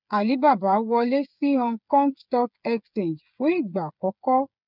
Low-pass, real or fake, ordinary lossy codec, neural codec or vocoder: 5.4 kHz; fake; none; codec, 16 kHz, 8 kbps, FreqCodec, smaller model